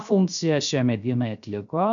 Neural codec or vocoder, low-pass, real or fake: codec, 16 kHz, 0.3 kbps, FocalCodec; 7.2 kHz; fake